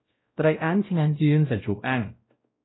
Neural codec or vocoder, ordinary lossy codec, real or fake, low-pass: codec, 16 kHz, 0.5 kbps, X-Codec, WavLM features, trained on Multilingual LibriSpeech; AAC, 16 kbps; fake; 7.2 kHz